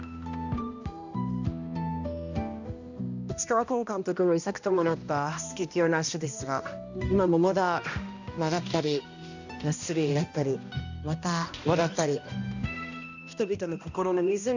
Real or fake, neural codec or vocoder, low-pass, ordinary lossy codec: fake; codec, 16 kHz, 1 kbps, X-Codec, HuBERT features, trained on balanced general audio; 7.2 kHz; none